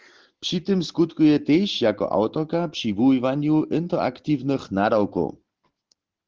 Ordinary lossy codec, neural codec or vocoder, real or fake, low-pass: Opus, 16 kbps; none; real; 7.2 kHz